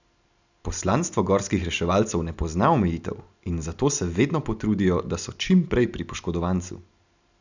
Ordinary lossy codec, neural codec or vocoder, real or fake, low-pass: none; none; real; 7.2 kHz